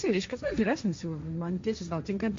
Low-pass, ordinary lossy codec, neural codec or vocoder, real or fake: 7.2 kHz; MP3, 48 kbps; codec, 16 kHz, 1.1 kbps, Voila-Tokenizer; fake